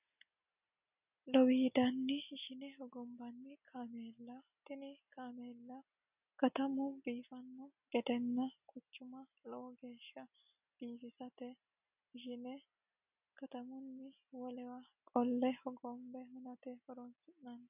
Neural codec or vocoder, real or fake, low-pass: none; real; 3.6 kHz